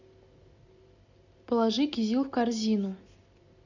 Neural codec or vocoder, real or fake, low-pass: none; real; 7.2 kHz